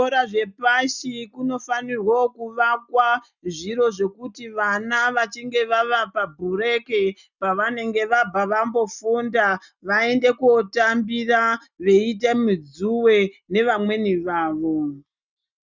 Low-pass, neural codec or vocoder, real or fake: 7.2 kHz; none; real